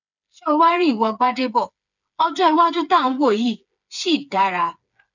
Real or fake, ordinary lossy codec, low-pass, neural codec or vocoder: fake; none; 7.2 kHz; codec, 16 kHz, 4 kbps, FreqCodec, smaller model